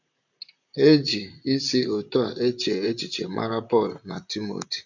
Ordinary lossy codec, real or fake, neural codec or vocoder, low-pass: none; fake; vocoder, 44.1 kHz, 128 mel bands, Pupu-Vocoder; 7.2 kHz